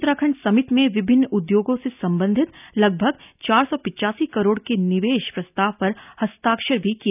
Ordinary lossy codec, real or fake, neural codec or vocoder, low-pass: none; real; none; 3.6 kHz